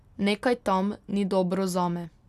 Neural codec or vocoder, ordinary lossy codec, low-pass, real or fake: none; none; 14.4 kHz; real